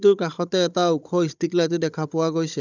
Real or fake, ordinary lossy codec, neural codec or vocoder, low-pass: fake; none; codec, 16 kHz, 16 kbps, FunCodec, trained on Chinese and English, 50 frames a second; 7.2 kHz